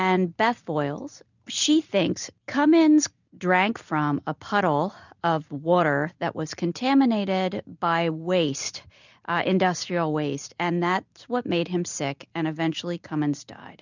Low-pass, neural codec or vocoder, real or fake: 7.2 kHz; none; real